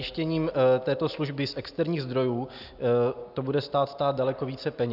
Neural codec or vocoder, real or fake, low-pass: none; real; 5.4 kHz